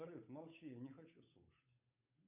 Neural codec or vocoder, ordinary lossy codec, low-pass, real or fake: codec, 16 kHz, 8 kbps, FunCodec, trained on Chinese and English, 25 frames a second; MP3, 32 kbps; 3.6 kHz; fake